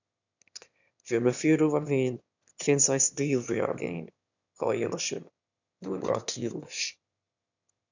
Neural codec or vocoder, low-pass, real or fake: autoencoder, 22.05 kHz, a latent of 192 numbers a frame, VITS, trained on one speaker; 7.2 kHz; fake